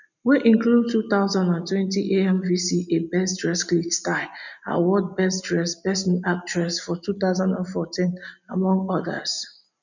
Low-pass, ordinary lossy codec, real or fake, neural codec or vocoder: 7.2 kHz; none; real; none